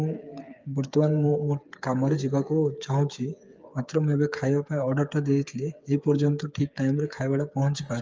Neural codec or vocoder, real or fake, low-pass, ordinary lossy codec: codec, 16 kHz, 8 kbps, FreqCodec, smaller model; fake; 7.2 kHz; Opus, 24 kbps